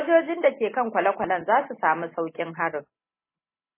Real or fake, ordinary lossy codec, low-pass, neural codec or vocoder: real; MP3, 16 kbps; 3.6 kHz; none